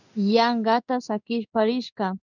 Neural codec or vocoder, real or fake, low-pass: codec, 16 kHz in and 24 kHz out, 1 kbps, XY-Tokenizer; fake; 7.2 kHz